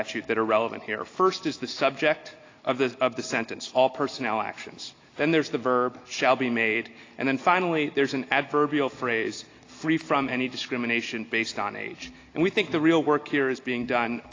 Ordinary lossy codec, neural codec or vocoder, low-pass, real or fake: AAC, 32 kbps; none; 7.2 kHz; real